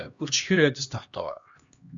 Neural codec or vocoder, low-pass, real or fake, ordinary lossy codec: codec, 16 kHz, 1 kbps, X-Codec, HuBERT features, trained on LibriSpeech; 7.2 kHz; fake; Opus, 64 kbps